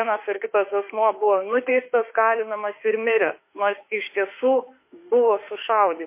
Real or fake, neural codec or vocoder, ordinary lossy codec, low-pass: fake; autoencoder, 48 kHz, 32 numbers a frame, DAC-VAE, trained on Japanese speech; MP3, 24 kbps; 3.6 kHz